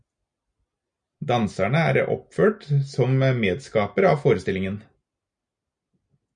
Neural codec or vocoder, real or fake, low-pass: none; real; 9.9 kHz